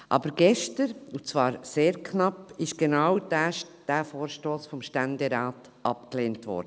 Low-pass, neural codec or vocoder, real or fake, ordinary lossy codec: none; none; real; none